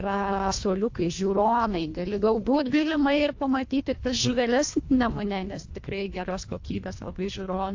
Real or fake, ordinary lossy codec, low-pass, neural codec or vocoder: fake; AAC, 48 kbps; 7.2 kHz; codec, 24 kHz, 1.5 kbps, HILCodec